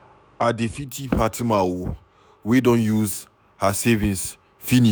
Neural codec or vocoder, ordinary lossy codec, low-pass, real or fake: autoencoder, 48 kHz, 128 numbers a frame, DAC-VAE, trained on Japanese speech; none; none; fake